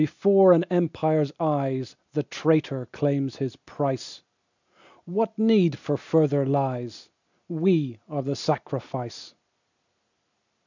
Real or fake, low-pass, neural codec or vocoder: real; 7.2 kHz; none